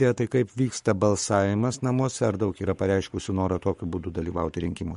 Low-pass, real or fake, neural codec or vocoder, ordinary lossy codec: 19.8 kHz; fake; codec, 44.1 kHz, 7.8 kbps, Pupu-Codec; MP3, 48 kbps